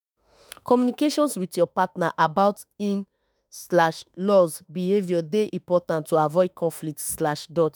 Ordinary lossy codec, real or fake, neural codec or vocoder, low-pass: none; fake; autoencoder, 48 kHz, 32 numbers a frame, DAC-VAE, trained on Japanese speech; none